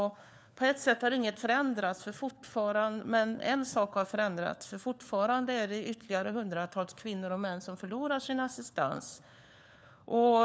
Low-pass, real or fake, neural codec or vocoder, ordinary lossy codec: none; fake; codec, 16 kHz, 4 kbps, FunCodec, trained on LibriTTS, 50 frames a second; none